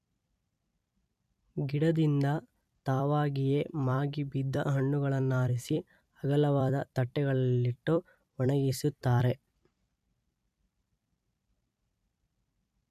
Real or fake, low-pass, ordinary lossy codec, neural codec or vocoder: fake; 14.4 kHz; none; vocoder, 44.1 kHz, 128 mel bands every 256 samples, BigVGAN v2